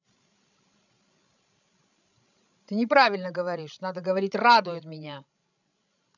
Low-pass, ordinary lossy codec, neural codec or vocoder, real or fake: 7.2 kHz; none; codec, 16 kHz, 16 kbps, FreqCodec, larger model; fake